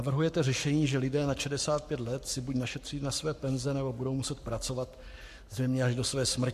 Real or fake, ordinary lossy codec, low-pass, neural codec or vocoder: fake; MP3, 64 kbps; 14.4 kHz; codec, 44.1 kHz, 7.8 kbps, Pupu-Codec